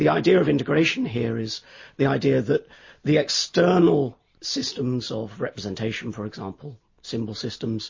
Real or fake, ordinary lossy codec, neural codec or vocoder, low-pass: real; MP3, 32 kbps; none; 7.2 kHz